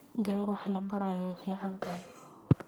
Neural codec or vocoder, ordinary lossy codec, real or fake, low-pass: codec, 44.1 kHz, 1.7 kbps, Pupu-Codec; none; fake; none